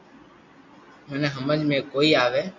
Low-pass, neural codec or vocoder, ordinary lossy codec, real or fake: 7.2 kHz; none; MP3, 48 kbps; real